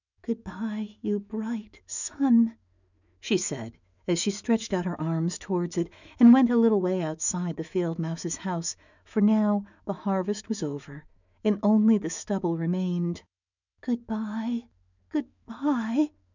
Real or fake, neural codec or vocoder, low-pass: fake; autoencoder, 48 kHz, 128 numbers a frame, DAC-VAE, trained on Japanese speech; 7.2 kHz